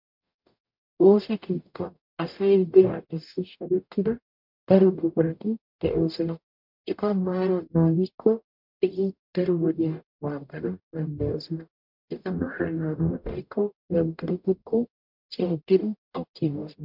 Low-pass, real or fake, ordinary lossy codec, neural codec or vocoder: 5.4 kHz; fake; MP3, 48 kbps; codec, 44.1 kHz, 0.9 kbps, DAC